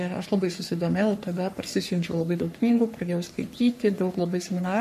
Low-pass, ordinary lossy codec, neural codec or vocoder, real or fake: 14.4 kHz; MP3, 64 kbps; codec, 44.1 kHz, 3.4 kbps, Pupu-Codec; fake